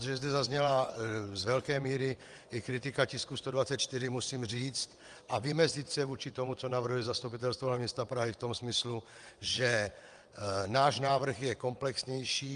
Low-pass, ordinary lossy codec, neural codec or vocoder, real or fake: 9.9 kHz; Opus, 32 kbps; vocoder, 22.05 kHz, 80 mel bands, WaveNeXt; fake